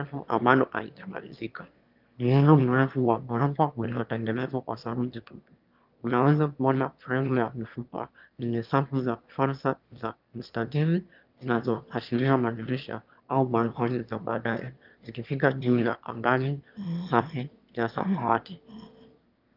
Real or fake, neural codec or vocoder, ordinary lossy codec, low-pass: fake; autoencoder, 22.05 kHz, a latent of 192 numbers a frame, VITS, trained on one speaker; Opus, 24 kbps; 5.4 kHz